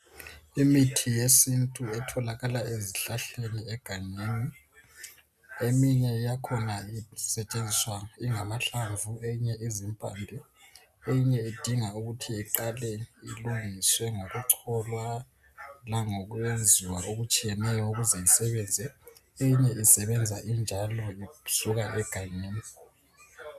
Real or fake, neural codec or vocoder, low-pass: real; none; 14.4 kHz